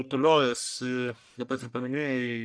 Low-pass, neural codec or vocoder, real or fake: 9.9 kHz; codec, 44.1 kHz, 1.7 kbps, Pupu-Codec; fake